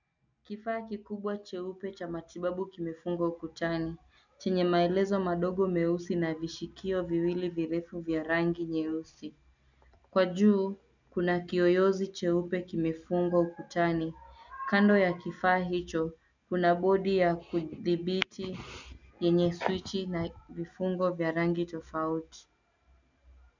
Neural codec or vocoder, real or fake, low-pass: none; real; 7.2 kHz